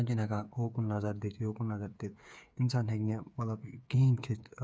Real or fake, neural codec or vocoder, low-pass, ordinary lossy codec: fake; codec, 16 kHz, 8 kbps, FreqCodec, smaller model; none; none